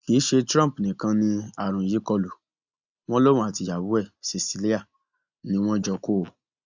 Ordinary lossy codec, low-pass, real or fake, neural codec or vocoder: Opus, 64 kbps; 7.2 kHz; real; none